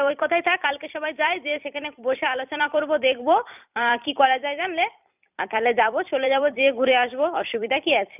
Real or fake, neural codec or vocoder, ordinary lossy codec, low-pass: fake; vocoder, 44.1 kHz, 128 mel bands every 256 samples, BigVGAN v2; none; 3.6 kHz